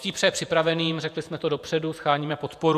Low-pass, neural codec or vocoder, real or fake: 14.4 kHz; vocoder, 48 kHz, 128 mel bands, Vocos; fake